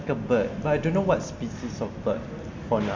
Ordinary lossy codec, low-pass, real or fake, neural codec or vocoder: MP3, 48 kbps; 7.2 kHz; real; none